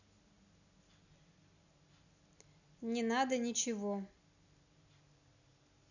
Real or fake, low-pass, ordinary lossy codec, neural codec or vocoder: real; 7.2 kHz; none; none